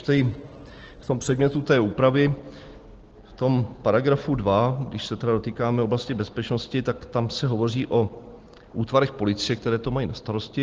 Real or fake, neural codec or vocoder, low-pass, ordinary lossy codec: real; none; 7.2 kHz; Opus, 16 kbps